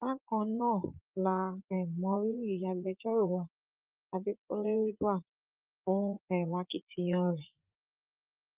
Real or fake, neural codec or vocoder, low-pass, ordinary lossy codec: fake; vocoder, 44.1 kHz, 80 mel bands, Vocos; 3.6 kHz; Opus, 32 kbps